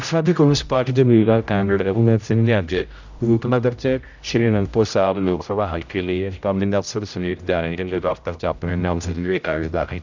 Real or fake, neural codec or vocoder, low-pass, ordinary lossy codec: fake; codec, 16 kHz, 0.5 kbps, X-Codec, HuBERT features, trained on general audio; 7.2 kHz; none